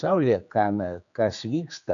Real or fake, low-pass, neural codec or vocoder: fake; 7.2 kHz; codec, 16 kHz, 4 kbps, X-Codec, HuBERT features, trained on general audio